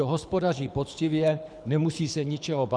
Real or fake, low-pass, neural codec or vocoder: fake; 9.9 kHz; codec, 24 kHz, 6 kbps, HILCodec